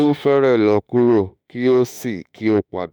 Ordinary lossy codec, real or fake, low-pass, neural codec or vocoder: none; fake; none; autoencoder, 48 kHz, 32 numbers a frame, DAC-VAE, trained on Japanese speech